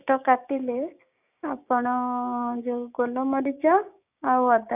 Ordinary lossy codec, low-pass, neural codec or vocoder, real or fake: none; 3.6 kHz; codec, 16 kHz, 6 kbps, DAC; fake